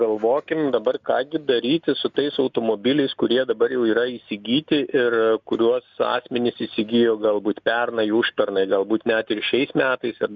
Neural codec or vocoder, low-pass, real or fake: none; 7.2 kHz; real